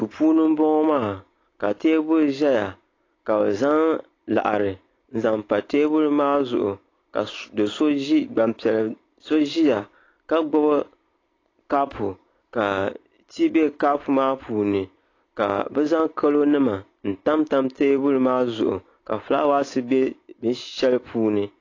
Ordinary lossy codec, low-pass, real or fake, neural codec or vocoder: AAC, 32 kbps; 7.2 kHz; real; none